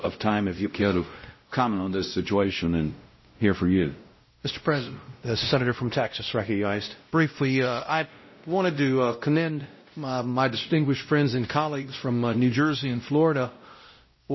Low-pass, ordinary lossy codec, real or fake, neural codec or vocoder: 7.2 kHz; MP3, 24 kbps; fake; codec, 16 kHz, 0.5 kbps, X-Codec, WavLM features, trained on Multilingual LibriSpeech